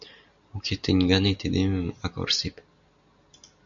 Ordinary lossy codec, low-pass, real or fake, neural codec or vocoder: MP3, 96 kbps; 7.2 kHz; real; none